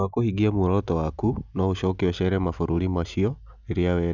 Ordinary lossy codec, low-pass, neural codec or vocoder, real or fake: none; 7.2 kHz; none; real